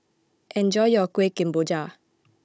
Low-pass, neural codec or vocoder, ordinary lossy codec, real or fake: none; codec, 16 kHz, 16 kbps, FunCodec, trained on Chinese and English, 50 frames a second; none; fake